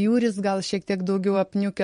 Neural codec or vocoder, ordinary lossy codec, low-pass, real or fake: vocoder, 44.1 kHz, 128 mel bands every 512 samples, BigVGAN v2; MP3, 48 kbps; 19.8 kHz; fake